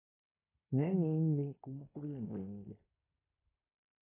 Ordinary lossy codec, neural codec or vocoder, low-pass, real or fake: AAC, 16 kbps; codec, 16 kHz in and 24 kHz out, 0.9 kbps, LongCat-Audio-Codec, fine tuned four codebook decoder; 3.6 kHz; fake